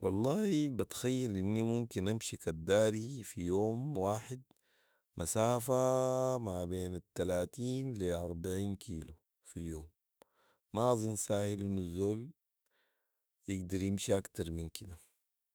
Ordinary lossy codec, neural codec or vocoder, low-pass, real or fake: none; autoencoder, 48 kHz, 32 numbers a frame, DAC-VAE, trained on Japanese speech; none; fake